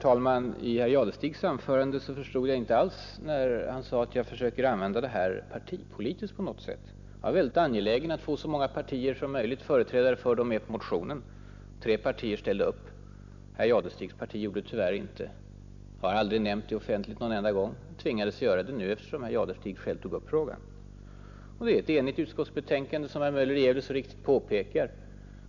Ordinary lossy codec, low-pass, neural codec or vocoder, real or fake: none; 7.2 kHz; none; real